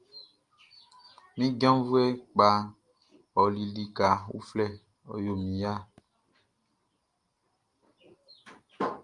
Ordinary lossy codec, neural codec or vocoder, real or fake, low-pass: Opus, 32 kbps; none; real; 10.8 kHz